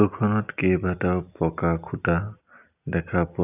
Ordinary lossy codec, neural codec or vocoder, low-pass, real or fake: none; none; 3.6 kHz; real